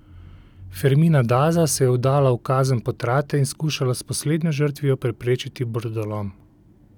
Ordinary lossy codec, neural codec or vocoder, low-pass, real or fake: none; none; 19.8 kHz; real